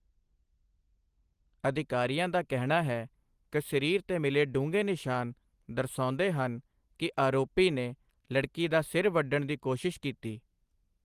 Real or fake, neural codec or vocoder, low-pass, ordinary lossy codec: real; none; 14.4 kHz; Opus, 24 kbps